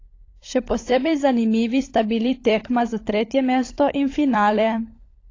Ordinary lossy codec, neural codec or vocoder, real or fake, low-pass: AAC, 32 kbps; codec, 16 kHz, 16 kbps, FunCodec, trained on LibriTTS, 50 frames a second; fake; 7.2 kHz